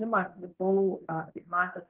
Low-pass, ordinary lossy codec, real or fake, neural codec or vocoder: 3.6 kHz; Opus, 16 kbps; fake; codec, 16 kHz in and 24 kHz out, 0.9 kbps, LongCat-Audio-Codec, fine tuned four codebook decoder